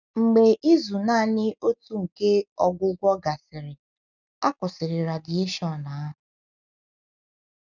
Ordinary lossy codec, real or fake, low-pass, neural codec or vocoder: none; real; 7.2 kHz; none